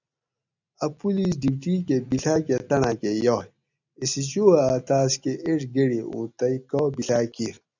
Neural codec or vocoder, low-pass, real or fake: none; 7.2 kHz; real